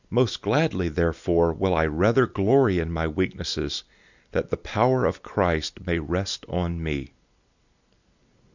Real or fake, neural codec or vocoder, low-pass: real; none; 7.2 kHz